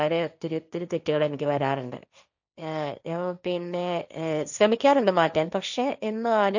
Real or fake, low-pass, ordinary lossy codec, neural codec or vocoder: fake; 7.2 kHz; none; codec, 16 kHz, 1.1 kbps, Voila-Tokenizer